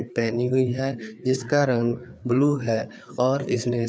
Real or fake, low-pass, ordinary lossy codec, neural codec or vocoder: fake; none; none; codec, 16 kHz, 4 kbps, FreqCodec, larger model